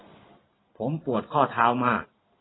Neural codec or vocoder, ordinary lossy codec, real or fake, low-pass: none; AAC, 16 kbps; real; 7.2 kHz